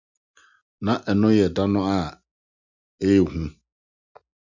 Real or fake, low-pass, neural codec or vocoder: real; 7.2 kHz; none